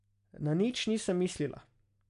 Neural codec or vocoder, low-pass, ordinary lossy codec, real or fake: none; 10.8 kHz; none; real